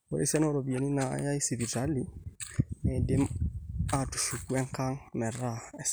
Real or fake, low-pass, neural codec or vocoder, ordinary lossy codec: real; none; none; none